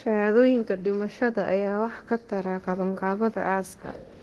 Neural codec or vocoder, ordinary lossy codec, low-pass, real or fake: codec, 24 kHz, 0.9 kbps, DualCodec; Opus, 16 kbps; 10.8 kHz; fake